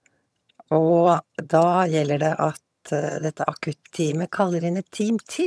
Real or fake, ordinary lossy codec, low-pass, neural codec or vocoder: fake; none; none; vocoder, 22.05 kHz, 80 mel bands, HiFi-GAN